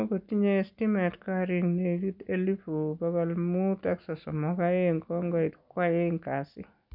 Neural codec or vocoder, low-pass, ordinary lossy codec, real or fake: none; 5.4 kHz; none; real